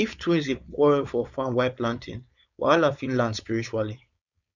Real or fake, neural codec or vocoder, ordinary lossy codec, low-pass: fake; codec, 16 kHz, 4.8 kbps, FACodec; none; 7.2 kHz